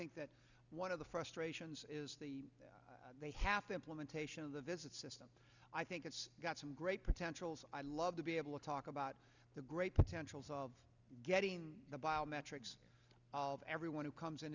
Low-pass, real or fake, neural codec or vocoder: 7.2 kHz; real; none